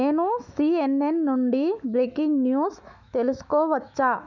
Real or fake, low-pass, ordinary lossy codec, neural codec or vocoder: fake; 7.2 kHz; none; autoencoder, 48 kHz, 128 numbers a frame, DAC-VAE, trained on Japanese speech